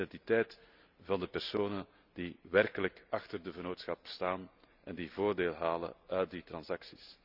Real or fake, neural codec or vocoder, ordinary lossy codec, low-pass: real; none; none; 5.4 kHz